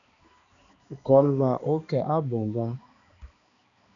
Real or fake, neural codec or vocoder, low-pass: fake; codec, 16 kHz, 2 kbps, X-Codec, HuBERT features, trained on balanced general audio; 7.2 kHz